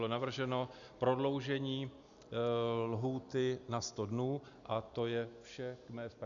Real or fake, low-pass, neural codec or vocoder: real; 7.2 kHz; none